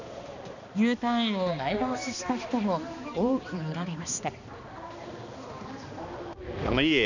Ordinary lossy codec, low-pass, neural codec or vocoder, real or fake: none; 7.2 kHz; codec, 16 kHz, 2 kbps, X-Codec, HuBERT features, trained on balanced general audio; fake